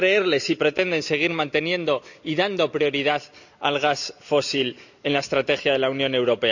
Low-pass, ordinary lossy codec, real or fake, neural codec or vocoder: 7.2 kHz; none; real; none